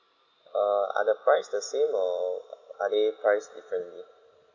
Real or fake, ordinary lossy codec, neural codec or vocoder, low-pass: real; none; none; 7.2 kHz